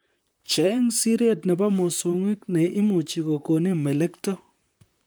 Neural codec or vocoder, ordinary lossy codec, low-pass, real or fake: vocoder, 44.1 kHz, 128 mel bands, Pupu-Vocoder; none; none; fake